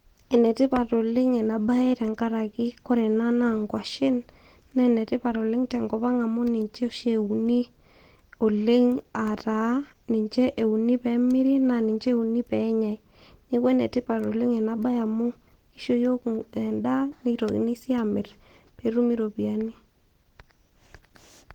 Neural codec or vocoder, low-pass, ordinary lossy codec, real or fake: none; 19.8 kHz; Opus, 16 kbps; real